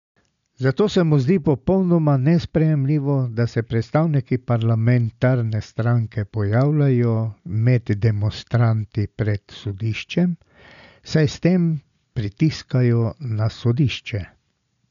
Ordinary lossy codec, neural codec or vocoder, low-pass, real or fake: none; none; 7.2 kHz; real